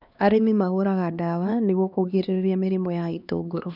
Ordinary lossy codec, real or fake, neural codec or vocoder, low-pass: none; fake; codec, 16 kHz, 2 kbps, X-Codec, HuBERT features, trained on LibriSpeech; 5.4 kHz